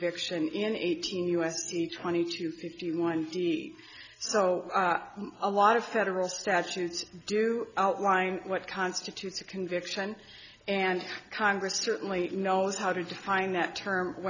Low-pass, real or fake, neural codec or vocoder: 7.2 kHz; real; none